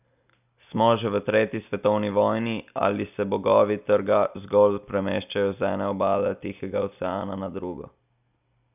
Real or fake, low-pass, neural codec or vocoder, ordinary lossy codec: real; 3.6 kHz; none; none